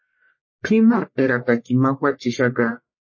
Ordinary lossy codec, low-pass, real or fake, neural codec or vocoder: MP3, 32 kbps; 7.2 kHz; fake; codec, 44.1 kHz, 1.7 kbps, Pupu-Codec